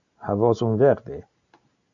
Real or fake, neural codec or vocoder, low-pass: real; none; 7.2 kHz